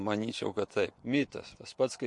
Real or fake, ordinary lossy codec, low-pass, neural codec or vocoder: fake; MP3, 48 kbps; 10.8 kHz; vocoder, 24 kHz, 100 mel bands, Vocos